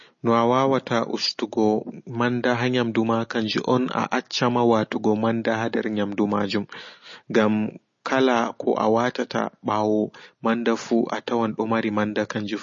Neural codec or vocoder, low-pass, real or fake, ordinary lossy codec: none; 7.2 kHz; real; MP3, 32 kbps